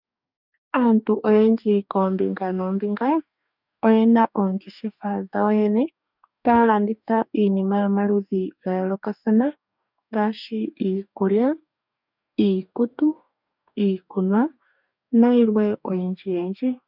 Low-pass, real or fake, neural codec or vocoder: 5.4 kHz; fake; codec, 44.1 kHz, 2.6 kbps, DAC